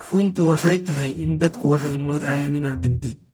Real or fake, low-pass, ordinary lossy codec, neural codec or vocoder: fake; none; none; codec, 44.1 kHz, 0.9 kbps, DAC